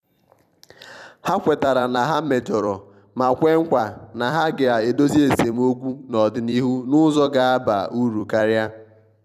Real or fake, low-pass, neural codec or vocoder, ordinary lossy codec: fake; 14.4 kHz; vocoder, 44.1 kHz, 128 mel bands every 256 samples, BigVGAN v2; none